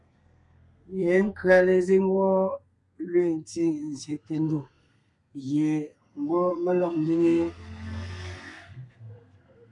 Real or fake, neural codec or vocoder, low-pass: fake; codec, 32 kHz, 1.9 kbps, SNAC; 10.8 kHz